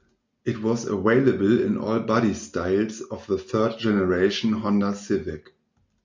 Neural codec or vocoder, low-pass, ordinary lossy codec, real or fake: none; 7.2 kHz; MP3, 64 kbps; real